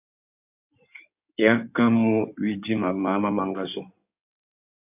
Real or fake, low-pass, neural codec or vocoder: fake; 3.6 kHz; codec, 16 kHz in and 24 kHz out, 2.2 kbps, FireRedTTS-2 codec